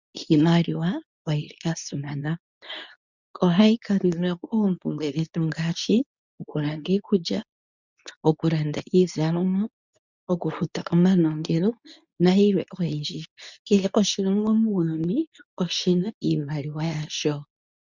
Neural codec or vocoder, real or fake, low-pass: codec, 24 kHz, 0.9 kbps, WavTokenizer, medium speech release version 2; fake; 7.2 kHz